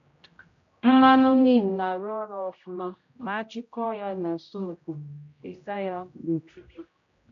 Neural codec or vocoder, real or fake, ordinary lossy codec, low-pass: codec, 16 kHz, 0.5 kbps, X-Codec, HuBERT features, trained on general audio; fake; MP3, 48 kbps; 7.2 kHz